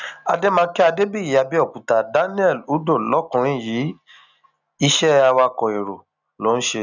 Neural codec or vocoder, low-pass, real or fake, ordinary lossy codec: none; 7.2 kHz; real; none